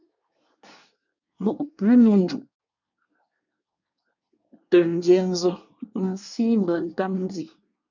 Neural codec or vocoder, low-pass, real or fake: codec, 24 kHz, 1 kbps, SNAC; 7.2 kHz; fake